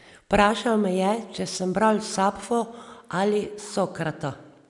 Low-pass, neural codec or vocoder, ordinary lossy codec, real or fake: 10.8 kHz; none; none; real